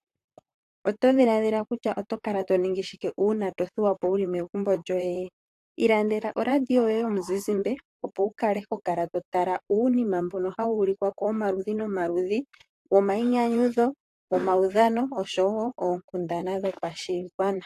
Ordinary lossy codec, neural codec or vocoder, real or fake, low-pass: AAC, 64 kbps; vocoder, 44.1 kHz, 128 mel bands, Pupu-Vocoder; fake; 14.4 kHz